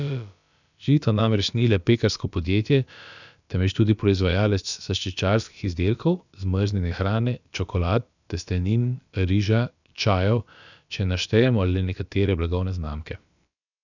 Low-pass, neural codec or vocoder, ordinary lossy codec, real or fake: 7.2 kHz; codec, 16 kHz, about 1 kbps, DyCAST, with the encoder's durations; none; fake